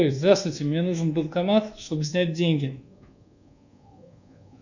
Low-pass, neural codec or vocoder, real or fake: 7.2 kHz; codec, 24 kHz, 1.2 kbps, DualCodec; fake